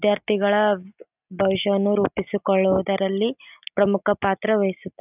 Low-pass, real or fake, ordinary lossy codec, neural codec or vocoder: 3.6 kHz; real; none; none